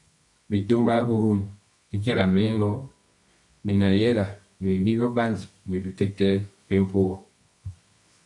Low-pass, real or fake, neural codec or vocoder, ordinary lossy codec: 10.8 kHz; fake; codec, 24 kHz, 0.9 kbps, WavTokenizer, medium music audio release; MP3, 64 kbps